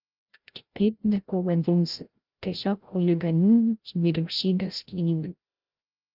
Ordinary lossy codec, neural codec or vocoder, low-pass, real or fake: Opus, 32 kbps; codec, 16 kHz, 0.5 kbps, FreqCodec, larger model; 5.4 kHz; fake